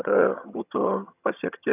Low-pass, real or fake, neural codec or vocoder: 3.6 kHz; fake; vocoder, 22.05 kHz, 80 mel bands, HiFi-GAN